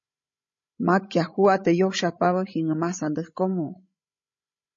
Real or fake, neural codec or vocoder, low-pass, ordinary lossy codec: fake; codec, 16 kHz, 16 kbps, FreqCodec, larger model; 7.2 kHz; MP3, 32 kbps